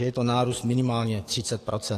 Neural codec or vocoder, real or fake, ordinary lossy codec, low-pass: codec, 44.1 kHz, 7.8 kbps, DAC; fake; AAC, 48 kbps; 14.4 kHz